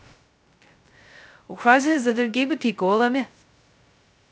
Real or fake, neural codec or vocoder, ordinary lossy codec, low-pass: fake; codec, 16 kHz, 0.2 kbps, FocalCodec; none; none